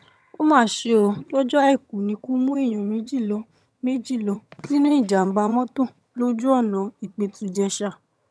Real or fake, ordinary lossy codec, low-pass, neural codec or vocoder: fake; none; none; vocoder, 22.05 kHz, 80 mel bands, HiFi-GAN